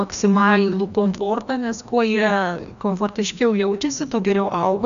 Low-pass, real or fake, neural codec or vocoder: 7.2 kHz; fake; codec, 16 kHz, 1 kbps, FreqCodec, larger model